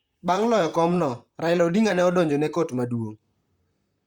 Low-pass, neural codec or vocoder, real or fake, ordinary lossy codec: 19.8 kHz; vocoder, 44.1 kHz, 128 mel bands, Pupu-Vocoder; fake; Opus, 64 kbps